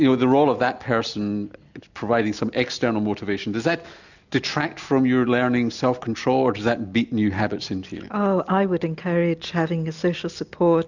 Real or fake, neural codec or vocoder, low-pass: real; none; 7.2 kHz